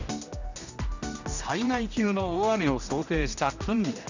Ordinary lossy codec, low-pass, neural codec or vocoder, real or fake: none; 7.2 kHz; codec, 16 kHz, 1 kbps, X-Codec, HuBERT features, trained on general audio; fake